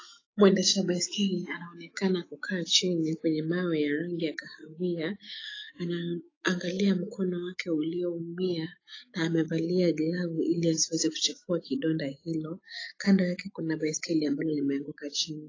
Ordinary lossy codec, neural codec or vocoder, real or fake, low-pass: AAC, 32 kbps; autoencoder, 48 kHz, 128 numbers a frame, DAC-VAE, trained on Japanese speech; fake; 7.2 kHz